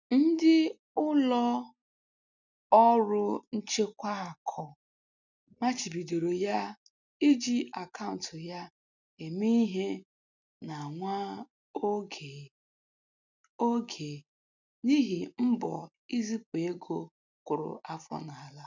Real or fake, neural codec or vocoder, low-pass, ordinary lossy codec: real; none; 7.2 kHz; none